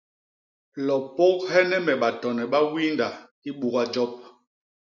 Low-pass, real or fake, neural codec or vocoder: 7.2 kHz; real; none